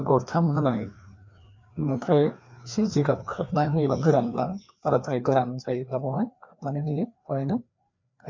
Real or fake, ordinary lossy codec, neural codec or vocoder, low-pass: fake; MP3, 48 kbps; codec, 16 kHz, 2 kbps, FreqCodec, larger model; 7.2 kHz